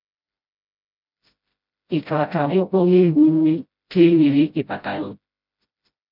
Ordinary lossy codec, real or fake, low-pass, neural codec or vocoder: MP3, 48 kbps; fake; 5.4 kHz; codec, 16 kHz, 0.5 kbps, FreqCodec, smaller model